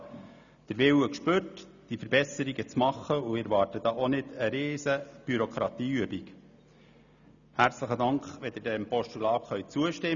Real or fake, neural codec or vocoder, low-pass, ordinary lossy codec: real; none; 7.2 kHz; none